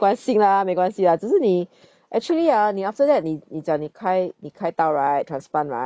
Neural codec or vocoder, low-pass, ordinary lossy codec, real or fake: none; none; none; real